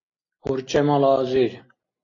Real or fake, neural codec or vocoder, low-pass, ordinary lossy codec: real; none; 7.2 kHz; AAC, 32 kbps